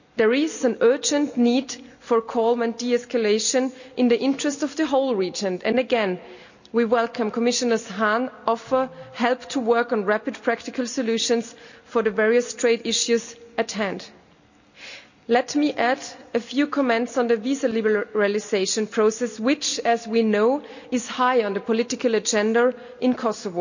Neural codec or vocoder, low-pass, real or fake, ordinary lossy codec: none; 7.2 kHz; real; none